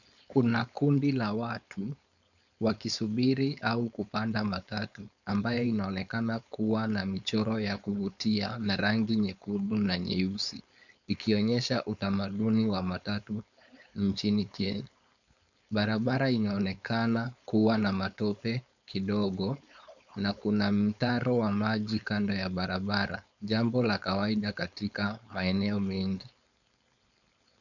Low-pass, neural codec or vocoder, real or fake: 7.2 kHz; codec, 16 kHz, 4.8 kbps, FACodec; fake